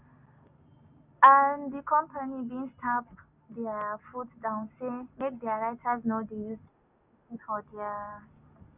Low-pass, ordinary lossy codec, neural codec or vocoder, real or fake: 3.6 kHz; none; none; real